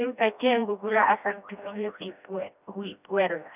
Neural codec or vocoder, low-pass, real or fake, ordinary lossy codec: codec, 16 kHz, 1 kbps, FreqCodec, smaller model; 3.6 kHz; fake; none